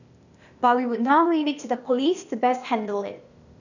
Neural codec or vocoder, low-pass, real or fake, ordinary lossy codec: codec, 16 kHz, 0.8 kbps, ZipCodec; 7.2 kHz; fake; none